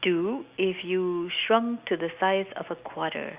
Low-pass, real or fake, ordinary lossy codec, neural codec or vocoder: 3.6 kHz; real; Opus, 64 kbps; none